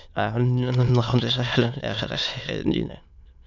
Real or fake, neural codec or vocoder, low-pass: fake; autoencoder, 22.05 kHz, a latent of 192 numbers a frame, VITS, trained on many speakers; 7.2 kHz